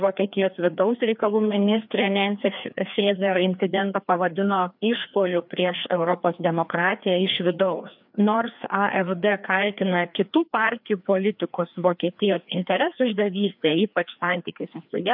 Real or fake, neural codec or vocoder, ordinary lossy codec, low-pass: fake; codec, 16 kHz, 2 kbps, FreqCodec, larger model; MP3, 48 kbps; 5.4 kHz